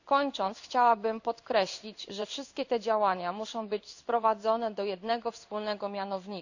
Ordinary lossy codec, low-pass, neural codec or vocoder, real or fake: none; 7.2 kHz; codec, 16 kHz in and 24 kHz out, 1 kbps, XY-Tokenizer; fake